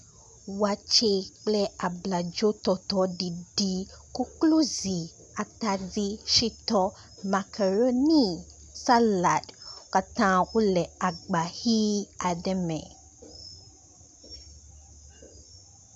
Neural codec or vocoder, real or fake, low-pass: none; real; 10.8 kHz